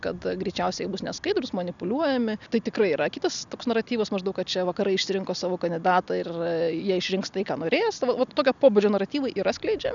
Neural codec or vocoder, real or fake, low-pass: none; real; 7.2 kHz